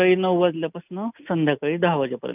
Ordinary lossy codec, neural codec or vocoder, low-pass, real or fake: none; none; 3.6 kHz; real